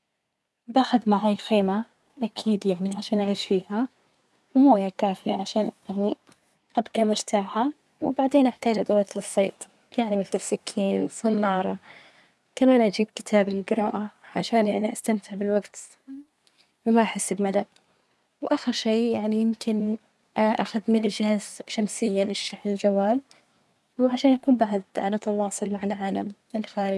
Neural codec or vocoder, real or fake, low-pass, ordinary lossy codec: codec, 24 kHz, 1 kbps, SNAC; fake; none; none